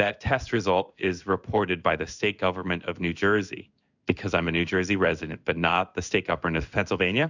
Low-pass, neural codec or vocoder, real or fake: 7.2 kHz; codec, 16 kHz in and 24 kHz out, 1 kbps, XY-Tokenizer; fake